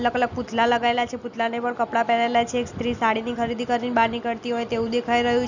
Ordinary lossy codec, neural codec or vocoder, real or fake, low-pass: none; none; real; 7.2 kHz